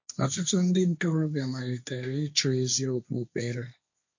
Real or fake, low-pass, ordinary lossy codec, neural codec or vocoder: fake; 7.2 kHz; MP3, 48 kbps; codec, 16 kHz, 1.1 kbps, Voila-Tokenizer